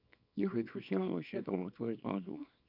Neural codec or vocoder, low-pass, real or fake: codec, 24 kHz, 0.9 kbps, WavTokenizer, small release; 5.4 kHz; fake